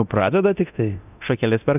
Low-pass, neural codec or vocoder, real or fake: 3.6 kHz; codec, 24 kHz, 0.9 kbps, DualCodec; fake